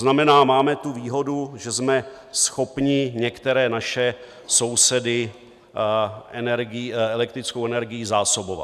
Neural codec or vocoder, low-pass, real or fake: none; 14.4 kHz; real